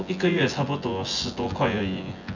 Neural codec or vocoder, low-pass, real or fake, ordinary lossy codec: vocoder, 24 kHz, 100 mel bands, Vocos; 7.2 kHz; fake; none